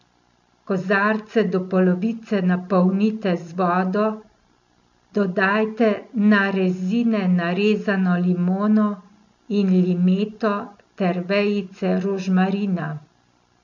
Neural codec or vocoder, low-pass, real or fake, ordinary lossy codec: none; 7.2 kHz; real; none